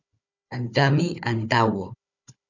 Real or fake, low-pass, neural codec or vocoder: fake; 7.2 kHz; codec, 16 kHz, 4 kbps, FunCodec, trained on Chinese and English, 50 frames a second